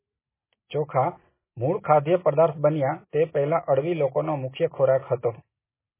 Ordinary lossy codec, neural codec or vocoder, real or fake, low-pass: MP3, 16 kbps; none; real; 3.6 kHz